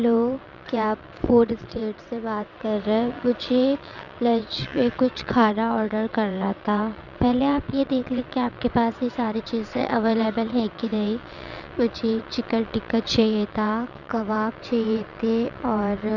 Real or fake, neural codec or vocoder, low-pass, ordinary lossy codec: fake; vocoder, 22.05 kHz, 80 mel bands, WaveNeXt; 7.2 kHz; none